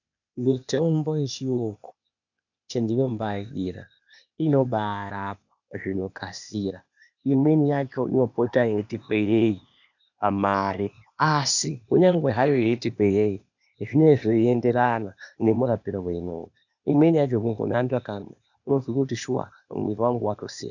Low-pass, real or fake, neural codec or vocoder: 7.2 kHz; fake; codec, 16 kHz, 0.8 kbps, ZipCodec